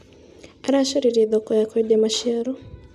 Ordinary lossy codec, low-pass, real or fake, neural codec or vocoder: none; 14.4 kHz; real; none